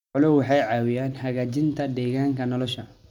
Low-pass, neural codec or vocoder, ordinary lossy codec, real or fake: 19.8 kHz; none; none; real